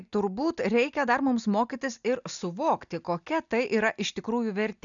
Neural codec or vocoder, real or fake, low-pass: none; real; 7.2 kHz